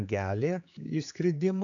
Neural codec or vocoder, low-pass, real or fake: codec, 16 kHz, 4 kbps, X-Codec, HuBERT features, trained on general audio; 7.2 kHz; fake